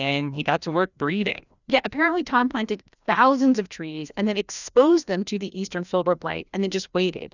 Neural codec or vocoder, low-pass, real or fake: codec, 16 kHz, 1 kbps, FreqCodec, larger model; 7.2 kHz; fake